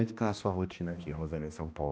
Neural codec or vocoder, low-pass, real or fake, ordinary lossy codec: codec, 16 kHz, 1 kbps, X-Codec, HuBERT features, trained on balanced general audio; none; fake; none